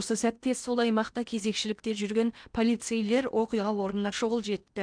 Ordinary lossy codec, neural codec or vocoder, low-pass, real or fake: none; codec, 16 kHz in and 24 kHz out, 0.8 kbps, FocalCodec, streaming, 65536 codes; 9.9 kHz; fake